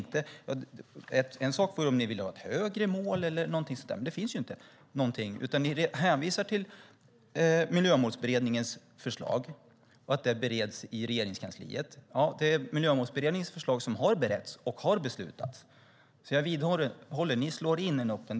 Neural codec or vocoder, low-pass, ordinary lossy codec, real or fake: none; none; none; real